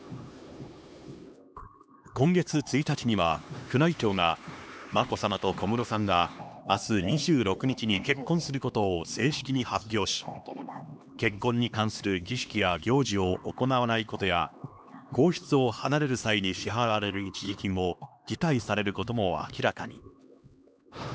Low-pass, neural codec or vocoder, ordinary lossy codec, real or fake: none; codec, 16 kHz, 2 kbps, X-Codec, HuBERT features, trained on LibriSpeech; none; fake